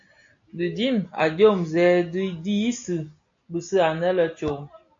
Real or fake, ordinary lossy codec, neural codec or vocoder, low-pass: real; AAC, 48 kbps; none; 7.2 kHz